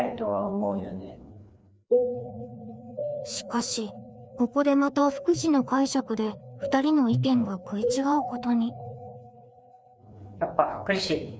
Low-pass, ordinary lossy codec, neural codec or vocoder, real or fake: none; none; codec, 16 kHz, 2 kbps, FreqCodec, larger model; fake